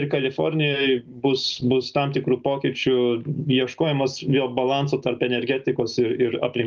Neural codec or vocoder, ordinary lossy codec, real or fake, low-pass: none; Opus, 24 kbps; real; 7.2 kHz